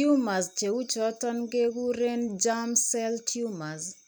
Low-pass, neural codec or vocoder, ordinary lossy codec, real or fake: none; none; none; real